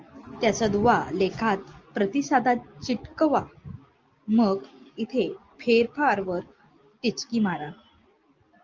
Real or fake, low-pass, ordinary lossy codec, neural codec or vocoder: real; 7.2 kHz; Opus, 24 kbps; none